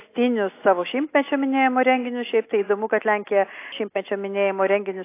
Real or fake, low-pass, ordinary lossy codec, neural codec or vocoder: real; 3.6 kHz; AAC, 24 kbps; none